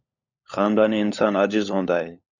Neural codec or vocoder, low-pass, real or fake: codec, 16 kHz, 16 kbps, FunCodec, trained on LibriTTS, 50 frames a second; 7.2 kHz; fake